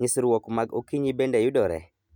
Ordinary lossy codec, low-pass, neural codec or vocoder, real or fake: none; 19.8 kHz; none; real